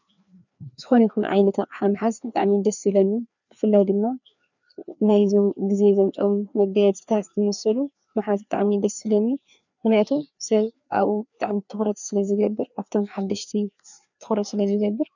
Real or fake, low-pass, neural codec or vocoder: fake; 7.2 kHz; codec, 16 kHz, 2 kbps, FreqCodec, larger model